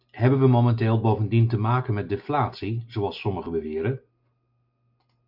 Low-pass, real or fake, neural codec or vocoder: 5.4 kHz; real; none